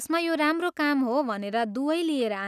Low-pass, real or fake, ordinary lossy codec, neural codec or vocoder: 14.4 kHz; real; none; none